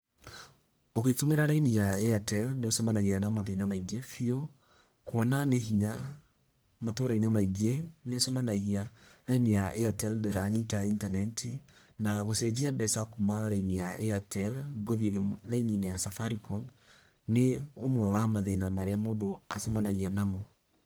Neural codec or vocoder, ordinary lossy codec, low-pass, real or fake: codec, 44.1 kHz, 1.7 kbps, Pupu-Codec; none; none; fake